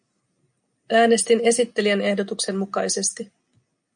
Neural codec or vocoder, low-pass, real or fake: none; 9.9 kHz; real